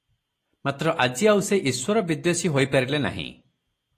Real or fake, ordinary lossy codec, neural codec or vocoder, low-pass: real; AAC, 48 kbps; none; 14.4 kHz